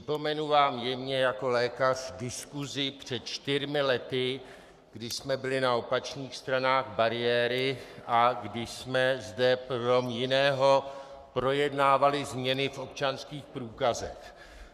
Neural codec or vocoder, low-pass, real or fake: codec, 44.1 kHz, 7.8 kbps, Pupu-Codec; 14.4 kHz; fake